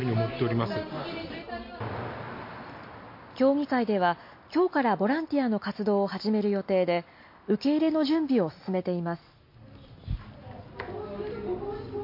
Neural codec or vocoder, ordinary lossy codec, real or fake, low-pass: none; MP3, 32 kbps; real; 5.4 kHz